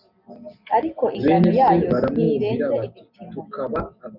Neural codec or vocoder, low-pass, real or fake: none; 5.4 kHz; real